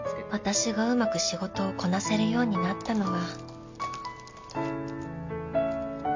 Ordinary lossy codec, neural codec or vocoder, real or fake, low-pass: MP3, 64 kbps; none; real; 7.2 kHz